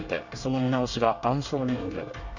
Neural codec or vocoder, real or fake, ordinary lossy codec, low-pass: codec, 24 kHz, 1 kbps, SNAC; fake; none; 7.2 kHz